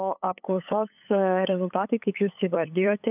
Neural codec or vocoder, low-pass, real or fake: codec, 16 kHz, 8 kbps, FunCodec, trained on LibriTTS, 25 frames a second; 3.6 kHz; fake